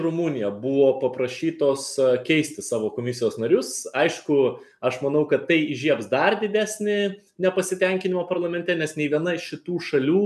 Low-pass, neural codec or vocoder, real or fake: 14.4 kHz; none; real